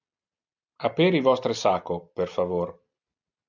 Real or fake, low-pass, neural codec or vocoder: real; 7.2 kHz; none